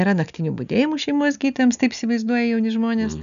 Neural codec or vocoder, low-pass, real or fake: none; 7.2 kHz; real